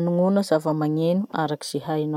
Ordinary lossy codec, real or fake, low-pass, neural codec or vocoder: MP3, 96 kbps; real; 19.8 kHz; none